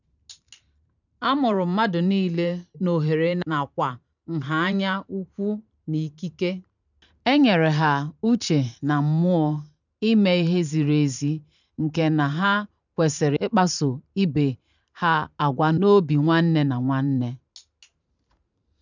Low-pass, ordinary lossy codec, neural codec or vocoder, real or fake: 7.2 kHz; none; vocoder, 44.1 kHz, 128 mel bands every 512 samples, BigVGAN v2; fake